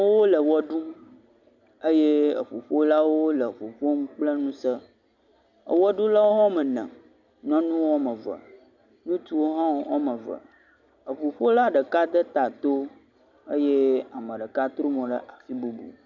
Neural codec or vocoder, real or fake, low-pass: none; real; 7.2 kHz